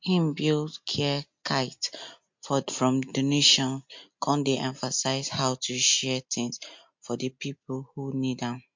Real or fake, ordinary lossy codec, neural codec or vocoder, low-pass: real; MP3, 48 kbps; none; 7.2 kHz